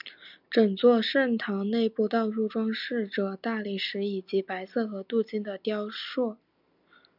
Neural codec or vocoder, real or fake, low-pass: none; real; 5.4 kHz